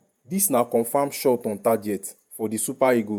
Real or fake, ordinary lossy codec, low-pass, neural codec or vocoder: fake; none; none; vocoder, 48 kHz, 128 mel bands, Vocos